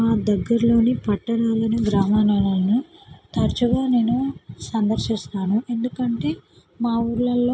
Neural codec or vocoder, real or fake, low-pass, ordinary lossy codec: none; real; none; none